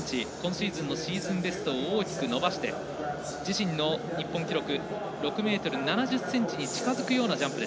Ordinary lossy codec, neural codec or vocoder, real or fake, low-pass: none; none; real; none